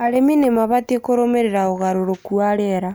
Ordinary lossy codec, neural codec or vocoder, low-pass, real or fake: none; none; none; real